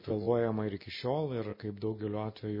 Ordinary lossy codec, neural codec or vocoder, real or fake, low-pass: MP3, 24 kbps; none; real; 5.4 kHz